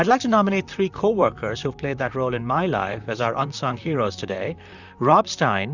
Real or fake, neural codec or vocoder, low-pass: fake; vocoder, 44.1 kHz, 128 mel bands, Pupu-Vocoder; 7.2 kHz